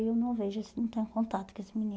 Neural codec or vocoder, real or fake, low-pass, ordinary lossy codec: none; real; none; none